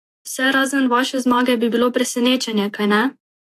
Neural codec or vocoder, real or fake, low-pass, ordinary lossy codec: vocoder, 48 kHz, 128 mel bands, Vocos; fake; 14.4 kHz; AAC, 64 kbps